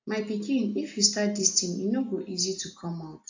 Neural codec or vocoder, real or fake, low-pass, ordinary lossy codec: none; real; 7.2 kHz; AAC, 48 kbps